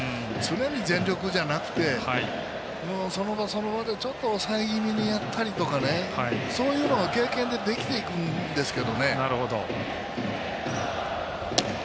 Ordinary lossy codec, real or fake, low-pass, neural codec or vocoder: none; real; none; none